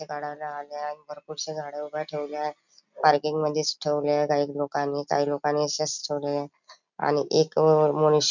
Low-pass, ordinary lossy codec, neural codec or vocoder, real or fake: 7.2 kHz; none; none; real